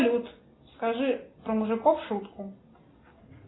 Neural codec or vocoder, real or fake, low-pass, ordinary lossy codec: none; real; 7.2 kHz; AAC, 16 kbps